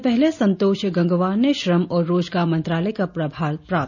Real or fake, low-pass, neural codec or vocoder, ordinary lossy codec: real; 7.2 kHz; none; none